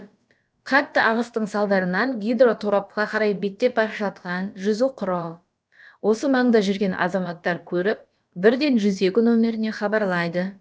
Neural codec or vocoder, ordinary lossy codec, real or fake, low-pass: codec, 16 kHz, about 1 kbps, DyCAST, with the encoder's durations; none; fake; none